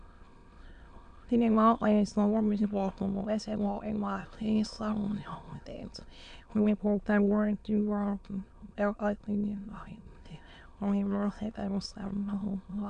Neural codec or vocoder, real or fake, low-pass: autoencoder, 22.05 kHz, a latent of 192 numbers a frame, VITS, trained on many speakers; fake; 9.9 kHz